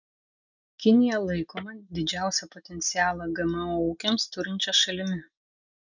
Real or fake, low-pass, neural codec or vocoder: real; 7.2 kHz; none